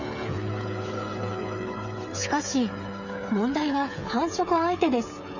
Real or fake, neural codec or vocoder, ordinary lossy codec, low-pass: fake; codec, 16 kHz, 8 kbps, FreqCodec, smaller model; none; 7.2 kHz